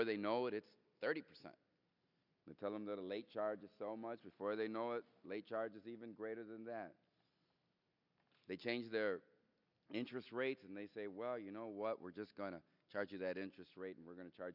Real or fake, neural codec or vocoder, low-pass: real; none; 5.4 kHz